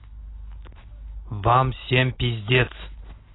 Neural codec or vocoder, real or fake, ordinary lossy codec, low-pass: none; real; AAC, 16 kbps; 7.2 kHz